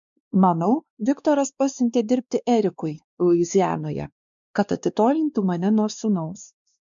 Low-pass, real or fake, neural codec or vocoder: 7.2 kHz; fake; codec, 16 kHz, 2 kbps, X-Codec, WavLM features, trained on Multilingual LibriSpeech